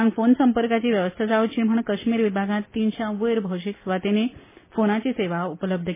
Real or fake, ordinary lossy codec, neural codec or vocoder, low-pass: real; MP3, 16 kbps; none; 3.6 kHz